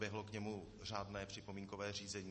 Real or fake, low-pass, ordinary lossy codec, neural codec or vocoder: real; 10.8 kHz; MP3, 32 kbps; none